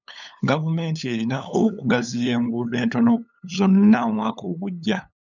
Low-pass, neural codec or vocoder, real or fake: 7.2 kHz; codec, 16 kHz, 8 kbps, FunCodec, trained on LibriTTS, 25 frames a second; fake